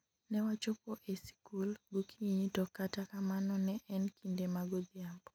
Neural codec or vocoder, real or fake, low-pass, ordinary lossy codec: none; real; 19.8 kHz; none